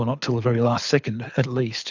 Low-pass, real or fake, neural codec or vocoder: 7.2 kHz; fake; codec, 24 kHz, 6 kbps, HILCodec